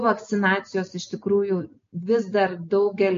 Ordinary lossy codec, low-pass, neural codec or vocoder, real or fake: MP3, 48 kbps; 7.2 kHz; none; real